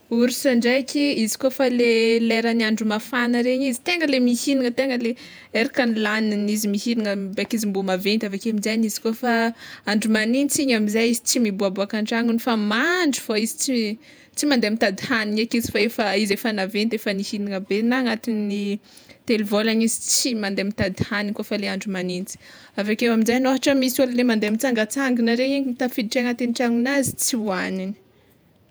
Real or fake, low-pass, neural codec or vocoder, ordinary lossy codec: fake; none; vocoder, 48 kHz, 128 mel bands, Vocos; none